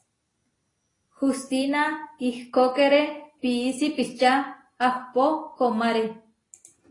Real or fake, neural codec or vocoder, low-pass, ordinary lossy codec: real; none; 10.8 kHz; AAC, 32 kbps